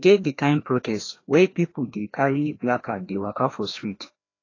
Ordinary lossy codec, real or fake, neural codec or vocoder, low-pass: AAC, 32 kbps; fake; codec, 16 kHz, 1 kbps, FreqCodec, larger model; 7.2 kHz